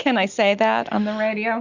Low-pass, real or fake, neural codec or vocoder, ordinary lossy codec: 7.2 kHz; real; none; Opus, 64 kbps